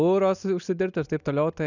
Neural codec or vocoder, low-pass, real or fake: none; 7.2 kHz; real